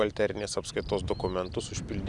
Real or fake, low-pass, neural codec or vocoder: real; 10.8 kHz; none